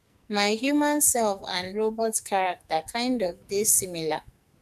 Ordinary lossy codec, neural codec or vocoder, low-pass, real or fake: none; codec, 44.1 kHz, 2.6 kbps, SNAC; 14.4 kHz; fake